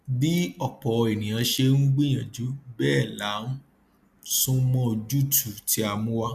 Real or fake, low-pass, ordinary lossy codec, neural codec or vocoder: real; 14.4 kHz; MP3, 96 kbps; none